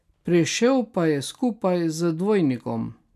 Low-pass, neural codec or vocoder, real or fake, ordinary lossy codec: 14.4 kHz; none; real; none